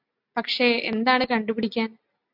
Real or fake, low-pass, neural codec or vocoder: real; 5.4 kHz; none